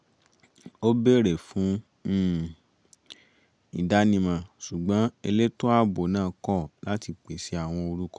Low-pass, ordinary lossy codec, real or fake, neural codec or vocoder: 9.9 kHz; none; real; none